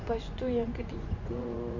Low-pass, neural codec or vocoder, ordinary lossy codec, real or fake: 7.2 kHz; vocoder, 24 kHz, 100 mel bands, Vocos; none; fake